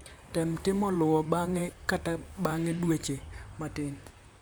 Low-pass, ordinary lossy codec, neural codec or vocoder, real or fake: none; none; vocoder, 44.1 kHz, 128 mel bands, Pupu-Vocoder; fake